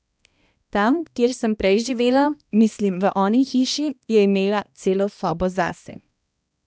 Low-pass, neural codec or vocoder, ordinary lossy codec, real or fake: none; codec, 16 kHz, 1 kbps, X-Codec, HuBERT features, trained on balanced general audio; none; fake